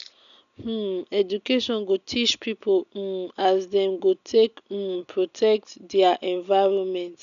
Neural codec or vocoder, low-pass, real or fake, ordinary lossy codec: none; 7.2 kHz; real; none